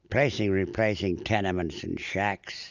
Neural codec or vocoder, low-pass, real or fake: none; 7.2 kHz; real